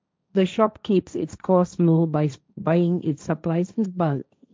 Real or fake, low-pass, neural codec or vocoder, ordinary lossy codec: fake; none; codec, 16 kHz, 1.1 kbps, Voila-Tokenizer; none